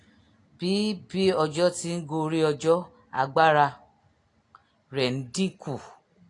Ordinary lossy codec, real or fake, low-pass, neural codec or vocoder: AAC, 48 kbps; real; 10.8 kHz; none